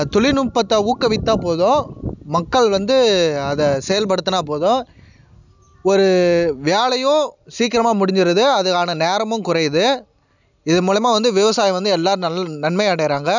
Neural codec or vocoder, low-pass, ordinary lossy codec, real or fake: none; 7.2 kHz; none; real